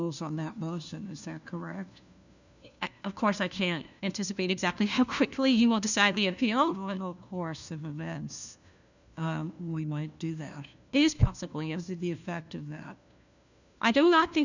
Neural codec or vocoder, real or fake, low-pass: codec, 16 kHz, 1 kbps, FunCodec, trained on LibriTTS, 50 frames a second; fake; 7.2 kHz